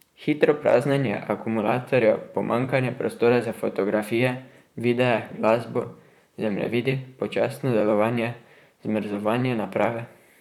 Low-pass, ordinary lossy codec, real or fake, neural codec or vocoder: 19.8 kHz; none; fake; vocoder, 44.1 kHz, 128 mel bands, Pupu-Vocoder